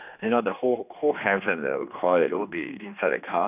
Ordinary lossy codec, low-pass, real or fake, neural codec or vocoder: none; 3.6 kHz; fake; codec, 16 kHz, 2 kbps, X-Codec, HuBERT features, trained on general audio